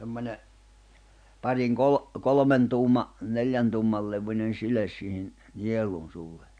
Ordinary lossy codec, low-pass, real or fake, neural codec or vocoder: none; 9.9 kHz; real; none